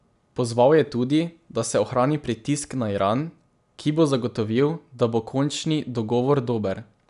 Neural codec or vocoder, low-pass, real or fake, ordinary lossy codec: none; 10.8 kHz; real; none